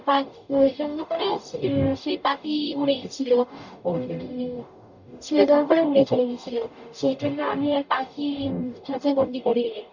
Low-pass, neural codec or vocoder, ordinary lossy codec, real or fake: 7.2 kHz; codec, 44.1 kHz, 0.9 kbps, DAC; none; fake